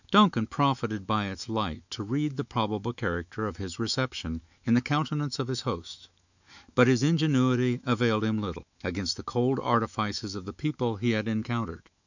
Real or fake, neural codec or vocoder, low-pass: fake; autoencoder, 48 kHz, 128 numbers a frame, DAC-VAE, trained on Japanese speech; 7.2 kHz